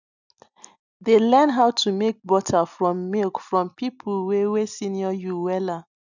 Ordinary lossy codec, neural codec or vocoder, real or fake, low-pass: none; none; real; 7.2 kHz